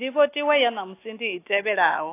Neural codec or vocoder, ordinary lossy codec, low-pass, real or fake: none; AAC, 24 kbps; 3.6 kHz; real